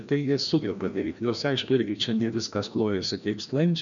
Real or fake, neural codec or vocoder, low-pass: fake; codec, 16 kHz, 1 kbps, FreqCodec, larger model; 7.2 kHz